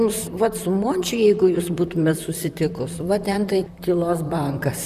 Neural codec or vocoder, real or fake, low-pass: vocoder, 44.1 kHz, 128 mel bands, Pupu-Vocoder; fake; 14.4 kHz